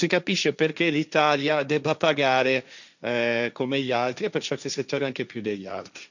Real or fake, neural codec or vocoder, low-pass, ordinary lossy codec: fake; codec, 16 kHz, 1.1 kbps, Voila-Tokenizer; 7.2 kHz; none